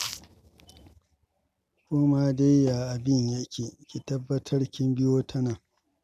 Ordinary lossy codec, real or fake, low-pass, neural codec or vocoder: none; real; 14.4 kHz; none